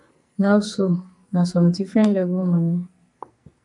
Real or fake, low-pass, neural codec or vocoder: fake; 10.8 kHz; codec, 44.1 kHz, 2.6 kbps, SNAC